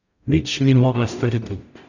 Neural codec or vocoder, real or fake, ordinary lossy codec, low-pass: codec, 44.1 kHz, 0.9 kbps, DAC; fake; none; 7.2 kHz